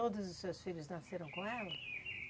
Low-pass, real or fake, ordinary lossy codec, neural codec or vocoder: none; real; none; none